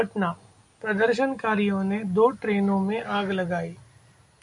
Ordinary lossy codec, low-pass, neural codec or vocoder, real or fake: AAC, 64 kbps; 10.8 kHz; none; real